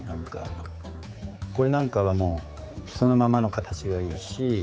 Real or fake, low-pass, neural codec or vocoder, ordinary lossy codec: fake; none; codec, 16 kHz, 4 kbps, X-Codec, HuBERT features, trained on general audio; none